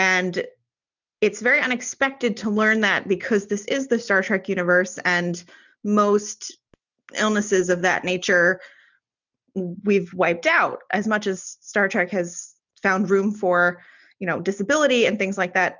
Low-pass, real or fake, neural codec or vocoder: 7.2 kHz; real; none